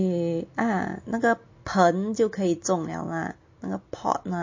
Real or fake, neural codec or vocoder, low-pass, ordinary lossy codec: real; none; 7.2 kHz; MP3, 32 kbps